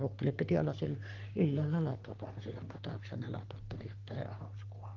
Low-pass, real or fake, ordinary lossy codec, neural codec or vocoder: 7.2 kHz; fake; Opus, 16 kbps; codec, 44.1 kHz, 3.4 kbps, Pupu-Codec